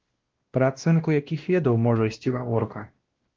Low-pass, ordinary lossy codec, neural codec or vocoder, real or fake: 7.2 kHz; Opus, 16 kbps; codec, 16 kHz, 1 kbps, X-Codec, WavLM features, trained on Multilingual LibriSpeech; fake